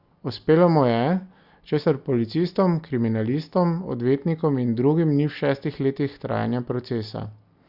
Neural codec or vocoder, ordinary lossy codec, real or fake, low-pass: none; Opus, 64 kbps; real; 5.4 kHz